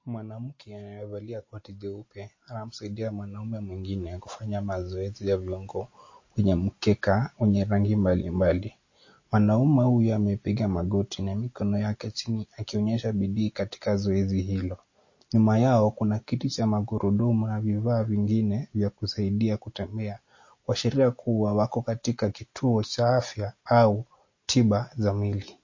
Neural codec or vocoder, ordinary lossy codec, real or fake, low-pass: none; MP3, 32 kbps; real; 7.2 kHz